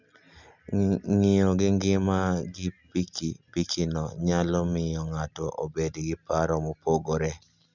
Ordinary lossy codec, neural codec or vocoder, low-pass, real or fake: none; none; 7.2 kHz; real